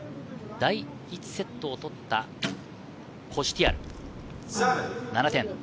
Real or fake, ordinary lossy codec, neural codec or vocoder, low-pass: real; none; none; none